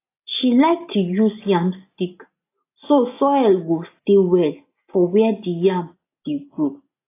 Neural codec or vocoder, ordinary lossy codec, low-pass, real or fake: none; AAC, 24 kbps; 3.6 kHz; real